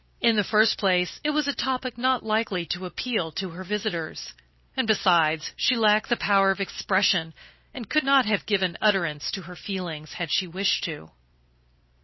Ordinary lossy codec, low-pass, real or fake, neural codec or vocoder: MP3, 24 kbps; 7.2 kHz; fake; vocoder, 44.1 kHz, 128 mel bands every 256 samples, BigVGAN v2